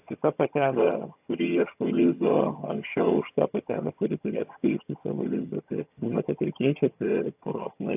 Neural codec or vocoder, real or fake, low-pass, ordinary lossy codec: vocoder, 22.05 kHz, 80 mel bands, HiFi-GAN; fake; 3.6 kHz; AAC, 32 kbps